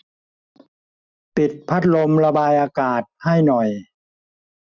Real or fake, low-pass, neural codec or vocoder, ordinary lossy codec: real; 7.2 kHz; none; none